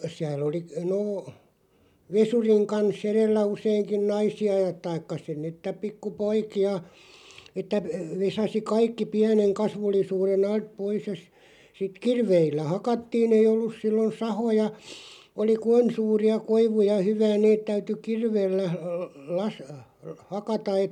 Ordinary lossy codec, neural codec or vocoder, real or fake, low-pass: none; none; real; 19.8 kHz